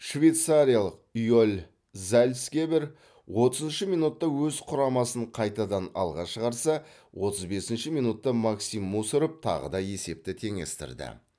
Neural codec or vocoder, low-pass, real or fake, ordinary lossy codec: none; none; real; none